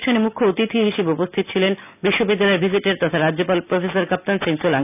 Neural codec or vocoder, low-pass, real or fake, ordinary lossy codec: none; 3.6 kHz; real; none